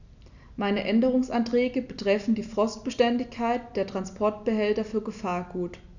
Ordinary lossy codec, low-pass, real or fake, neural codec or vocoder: none; 7.2 kHz; real; none